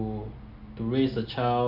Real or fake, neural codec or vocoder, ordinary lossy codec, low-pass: real; none; none; 5.4 kHz